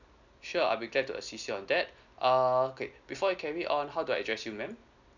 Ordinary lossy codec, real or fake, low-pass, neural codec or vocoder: none; real; 7.2 kHz; none